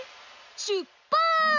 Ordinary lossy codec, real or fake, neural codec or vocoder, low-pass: none; real; none; 7.2 kHz